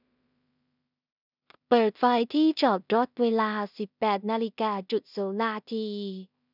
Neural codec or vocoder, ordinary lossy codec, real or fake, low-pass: codec, 16 kHz in and 24 kHz out, 0.4 kbps, LongCat-Audio-Codec, two codebook decoder; none; fake; 5.4 kHz